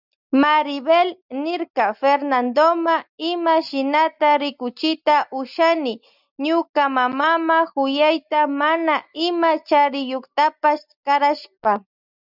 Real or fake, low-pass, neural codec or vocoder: real; 5.4 kHz; none